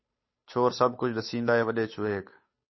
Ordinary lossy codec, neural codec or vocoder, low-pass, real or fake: MP3, 24 kbps; codec, 16 kHz, 8 kbps, FunCodec, trained on Chinese and English, 25 frames a second; 7.2 kHz; fake